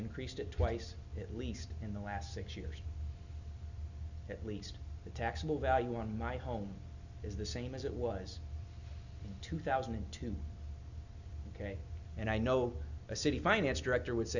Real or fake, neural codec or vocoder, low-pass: real; none; 7.2 kHz